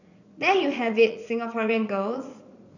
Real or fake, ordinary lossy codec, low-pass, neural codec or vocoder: fake; none; 7.2 kHz; vocoder, 44.1 kHz, 80 mel bands, Vocos